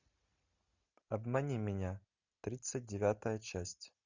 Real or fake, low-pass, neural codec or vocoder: real; 7.2 kHz; none